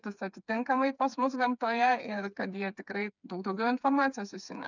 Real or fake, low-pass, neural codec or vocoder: fake; 7.2 kHz; codec, 16 kHz, 4 kbps, FreqCodec, smaller model